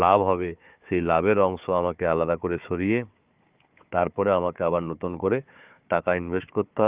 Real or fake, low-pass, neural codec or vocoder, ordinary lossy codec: fake; 3.6 kHz; codec, 16 kHz, 4 kbps, FunCodec, trained on Chinese and English, 50 frames a second; Opus, 32 kbps